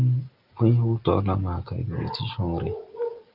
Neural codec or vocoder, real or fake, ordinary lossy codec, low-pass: none; real; Opus, 32 kbps; 5.4 kHz